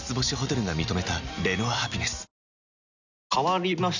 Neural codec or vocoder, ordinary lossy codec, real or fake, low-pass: none; none; real; 7.2 kHz